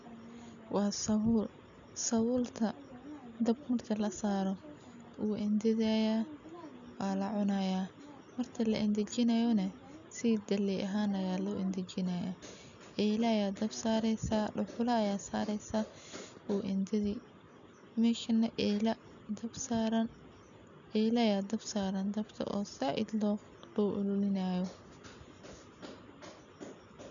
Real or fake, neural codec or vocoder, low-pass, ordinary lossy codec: real; none; 7.2 kHz; none